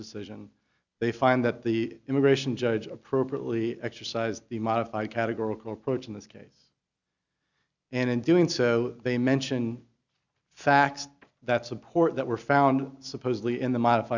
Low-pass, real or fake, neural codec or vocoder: 7.2 kHz; real; none